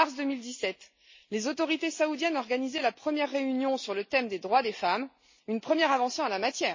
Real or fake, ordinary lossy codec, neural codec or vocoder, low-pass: real; MP3, 32 kbps; none; 7.2 kHz